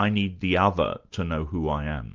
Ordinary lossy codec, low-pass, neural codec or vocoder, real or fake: Opus, 32 kbps; 7.2 kHz; none; real